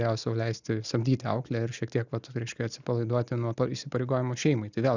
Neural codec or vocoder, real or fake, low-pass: none; real; 7.2 kHz